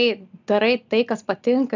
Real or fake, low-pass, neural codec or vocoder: real; 7.2 kHz; none